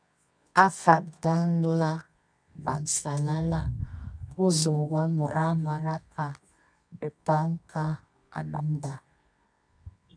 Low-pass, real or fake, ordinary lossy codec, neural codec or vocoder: 9.9 kHz; fake; MP3, 96 kbps; codec, 24 kHz, 0.9 kbps, WavTokenizer, medium music audio release